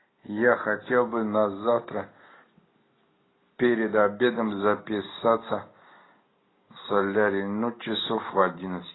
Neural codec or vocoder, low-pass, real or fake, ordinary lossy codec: none; 7.2 kHz; real; AAC, 16 kbps